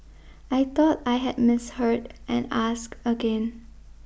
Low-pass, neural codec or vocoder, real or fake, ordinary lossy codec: none; none; real; none